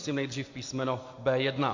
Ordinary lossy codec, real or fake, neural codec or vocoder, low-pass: MP3, 48 kbps; real; none; 7.2 kHz